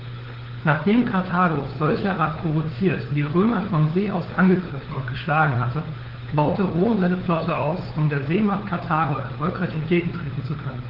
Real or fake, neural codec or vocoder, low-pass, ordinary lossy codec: fake; codec, 16 kHz, 4 kbps, FunCodec, trained on LibriTTS, 50 frames a second; 5.4 kHz; Opus, 16 kbps